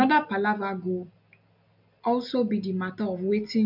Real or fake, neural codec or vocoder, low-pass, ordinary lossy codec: real; none; 5.4 kHz; none